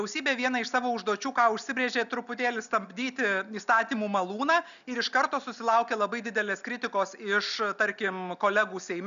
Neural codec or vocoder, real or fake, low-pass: none; real; 7.2 kHz